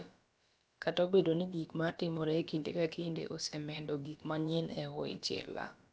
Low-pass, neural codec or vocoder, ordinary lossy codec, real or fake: none; codec, 16 kHz, about 1 kbps, DyCAST, with the encoder's durations; none; fake